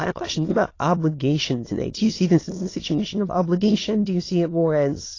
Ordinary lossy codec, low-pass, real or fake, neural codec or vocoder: AAC, 32 kbps; 7.2 kHz; fake; autoencoder, 22.05 kHz, a latent of 192 numbers a frame, VITS, trained on many speakers